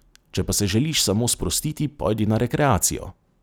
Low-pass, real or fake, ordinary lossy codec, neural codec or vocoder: none; real; none; none